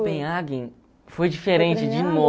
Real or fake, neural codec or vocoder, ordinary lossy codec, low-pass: real; none; none; none